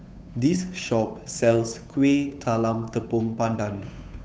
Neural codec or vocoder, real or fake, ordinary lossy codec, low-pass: codec, 16 kHz, 8 kbps, FunCodec, trained on Chinese and English, 25 frames a second; fake; none; none